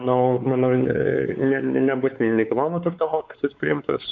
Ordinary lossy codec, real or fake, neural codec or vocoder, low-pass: AAC, 64 kbps; fake; codec, 16 kHz, 4 kbps, X-Codec, WavLM features, trained on Multilingual LibriSpeech; 7.2 kHz